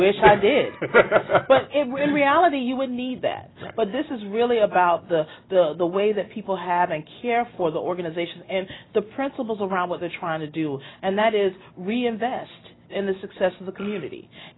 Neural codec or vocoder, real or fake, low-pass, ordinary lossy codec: none; real; 7.2 kHz; AAC, 16 kbps